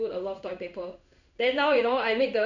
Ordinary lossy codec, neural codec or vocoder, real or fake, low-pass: none; codec, 16 kHz in and 24 kHz out, 1 kbps, XY-Tokenizer; fake; 7.2 kHz